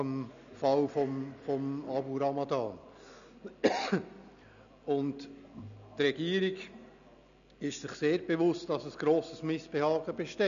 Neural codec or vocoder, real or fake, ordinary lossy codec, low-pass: none; real; none; 7.2 kHz